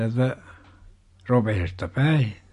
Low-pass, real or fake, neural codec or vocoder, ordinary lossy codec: 14.4 kHz; real; none; MP3, 48 kbps